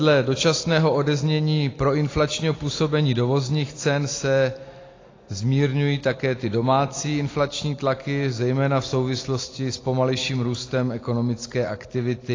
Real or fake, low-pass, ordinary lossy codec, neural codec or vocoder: real; 7.2 kHz; AAC, 32 kbps; none